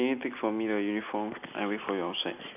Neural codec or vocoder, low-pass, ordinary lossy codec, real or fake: none; 3.6 kHz; none; real